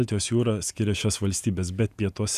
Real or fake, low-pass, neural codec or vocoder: fake; 14.4 kHz; vocoder, 48 kHz, 128 mel bands, Vocos